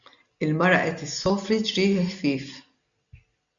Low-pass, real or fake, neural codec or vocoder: 7.2 kHz; real; none